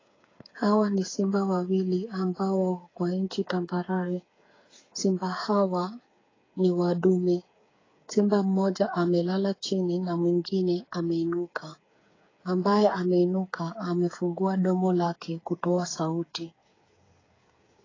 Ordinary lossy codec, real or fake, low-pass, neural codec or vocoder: AAC, 32 kbps; fake; 7.2 kHz; codec, 16 kHz, 8 kbps, FreqCodec, smaller model